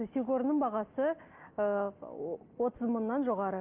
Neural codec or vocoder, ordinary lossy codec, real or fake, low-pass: none; Opus, 24 kbps; real; 3.6 kHz